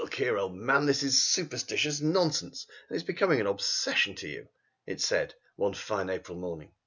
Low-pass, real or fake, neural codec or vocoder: 7.2 kHz; real; none